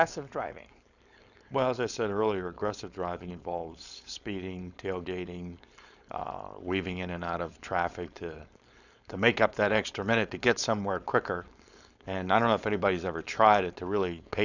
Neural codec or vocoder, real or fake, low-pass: codec, 16 kHz, 4.8 kbps, FACodec; fake; 7.2 kHz